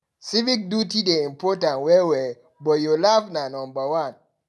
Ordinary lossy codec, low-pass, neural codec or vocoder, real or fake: none; none; none; real